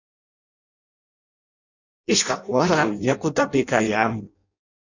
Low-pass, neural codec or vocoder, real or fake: 7.2 kHz; codec, 16 kHz in and 24 kHz out, 0.6 kbps, FireRedTTS-2 codec; fake